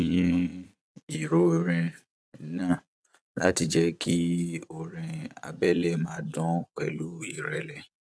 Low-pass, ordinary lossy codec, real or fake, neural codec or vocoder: none; none; fake; vocoder, 22.05 kHz, 80 mel bands, Vocos